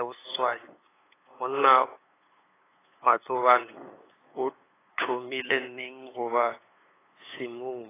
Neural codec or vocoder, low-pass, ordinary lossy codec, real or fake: codec, 16 kHz, 8 kbps, FunCodec, trained on LibriTTS, 25 frames a second; 3.6 kHz; AAC, 16 kbps; fake